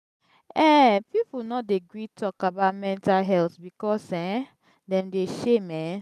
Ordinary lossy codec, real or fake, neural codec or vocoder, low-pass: none; real; none; 14.4 kHz